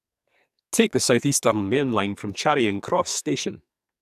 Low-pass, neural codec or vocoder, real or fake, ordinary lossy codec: 14.4 kHz; codec, 44.1 kHz, 2.6 kbps, SNAC; fake; none